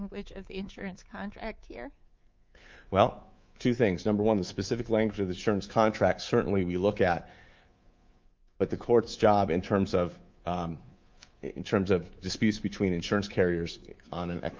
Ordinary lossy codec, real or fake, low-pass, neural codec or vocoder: Opus, 24 kbps; fake; 7.2 kHz; codec, 24 kHz, 3.1 kbps, DualCodec